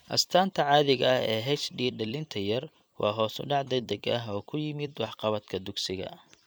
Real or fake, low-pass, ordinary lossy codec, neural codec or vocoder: fake; none; none; vocoder, 44.1 kHz, 128 mel bands every 256 samples, BigVGAN v2